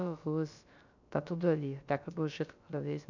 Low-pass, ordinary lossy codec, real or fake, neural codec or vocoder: 7.2 kHz; none; fake; codec, 16 kHz, about 1 kbps, DyCAST, with the encoder's durations